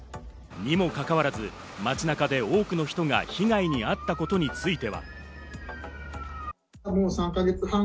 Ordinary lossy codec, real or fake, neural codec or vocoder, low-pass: none; real; none; none